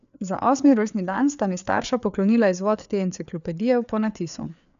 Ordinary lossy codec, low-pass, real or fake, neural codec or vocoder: none; 7.2 kHz; fake; codec, 16 kHz, 4 kbps, FreqCodec, larger model